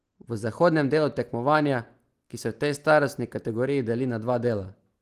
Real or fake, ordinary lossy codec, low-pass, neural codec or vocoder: real; Opus, 24 kbps; 14.4 kHz; none